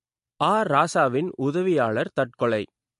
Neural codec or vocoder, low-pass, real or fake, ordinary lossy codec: none; 14.4 kHz; real; MP3, 48 kbps